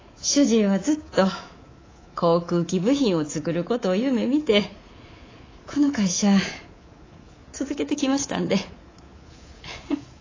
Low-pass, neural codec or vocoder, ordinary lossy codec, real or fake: 7.2 kHz; codec, 24 kHz, 3.1 kbps, DualCodec; AAC, 32 kbps; fake